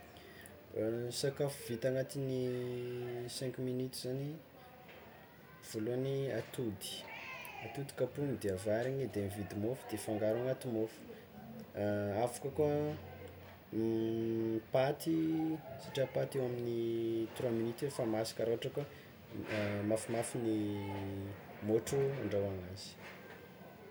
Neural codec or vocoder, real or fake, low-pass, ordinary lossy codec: none; real; none; none